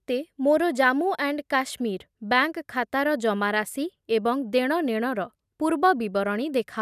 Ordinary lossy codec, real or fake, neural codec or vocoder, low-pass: none; real; none; 14.4 kHz